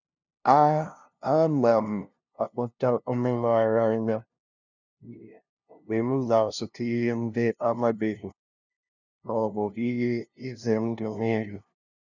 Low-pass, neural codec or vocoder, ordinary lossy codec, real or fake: 7.2 kHz; codec, 16 kHz, 0.5 kbps, FunCodec, trained on LibriTTS, 25 frames a second; none; fake